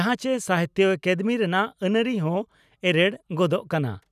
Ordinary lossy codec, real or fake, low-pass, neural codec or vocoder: none; real; 14.4 kHz; none